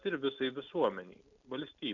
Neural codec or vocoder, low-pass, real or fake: none; 7.2 kHz; real